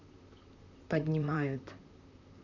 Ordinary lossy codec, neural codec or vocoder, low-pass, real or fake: none; vocoder, 44.1 kHz, 128 mel bands, Pupu-Vocoder; 7.2 kHz; fake